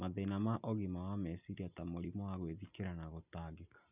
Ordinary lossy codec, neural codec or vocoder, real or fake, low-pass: none; none; real; 3.6 kHz